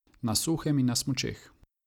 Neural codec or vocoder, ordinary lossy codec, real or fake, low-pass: none; none; real; 19.8 kHz